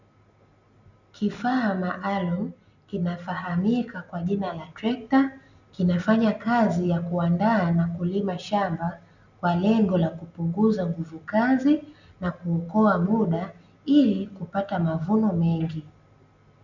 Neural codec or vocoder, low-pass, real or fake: none; 7.2 kHz; real